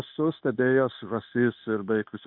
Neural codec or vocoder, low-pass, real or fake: codec, 16 kHz, 0.9 kbps, LongCat-Audio-Codec; 5.4 kHz; fake